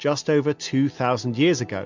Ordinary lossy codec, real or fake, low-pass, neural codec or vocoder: MP3, 64 kbps; real; 7.2 kHz; none